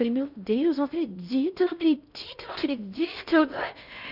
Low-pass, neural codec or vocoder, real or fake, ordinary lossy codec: 5.4 kHz; codec, 16 kHz in and 24 kHz out, 0.6 kbps, FocalCodec, streaming, 4096 codes; fake; none